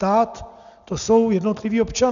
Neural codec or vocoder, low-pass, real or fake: none; 7.2 kHz; real